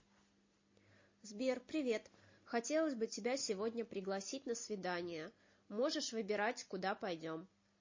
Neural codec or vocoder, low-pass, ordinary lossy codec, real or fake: none; 7.2 kHz; MP3, 32 kbps; real